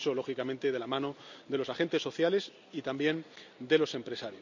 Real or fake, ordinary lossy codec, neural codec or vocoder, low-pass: real; none; none; 7.2 kHz